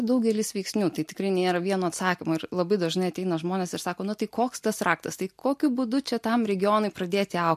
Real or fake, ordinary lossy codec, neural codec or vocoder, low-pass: real; MP3, 64 kbps; none; 14.4 kHz